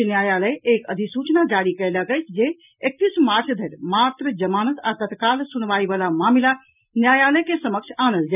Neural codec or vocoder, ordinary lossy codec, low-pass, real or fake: none; none; 3.6 kHz; real